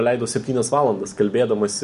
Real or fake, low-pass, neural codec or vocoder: real; 10.8 kHz; none